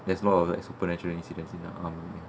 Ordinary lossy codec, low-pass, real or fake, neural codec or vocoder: none; none; real; none